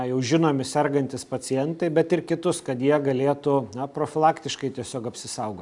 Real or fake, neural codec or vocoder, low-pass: real; none; 10.8 kHz